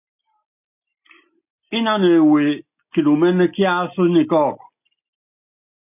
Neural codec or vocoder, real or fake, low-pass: none; real; 3.6 kHz